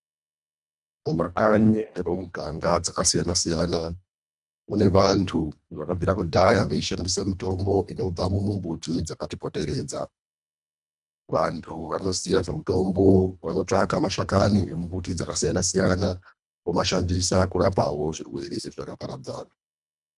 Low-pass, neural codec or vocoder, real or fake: 10.8 kHz; codec, 24 kHz, 1.5 kbps, HILCodec; fake